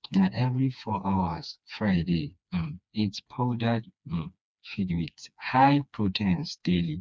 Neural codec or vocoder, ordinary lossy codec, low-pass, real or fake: codec, 16 kHz, 2 kbps, FreqCodec, smaller model; none; none; fake